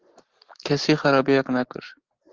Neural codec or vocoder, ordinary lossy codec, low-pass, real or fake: none; Opus, 16 kbps; 7.2 kHz; real